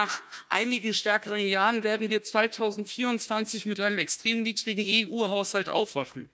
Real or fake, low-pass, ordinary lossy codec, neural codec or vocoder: fake; none; none; codec, 16 kHz, 1 kbps, FunCodec, trained on Chinese and English, 50 frames a second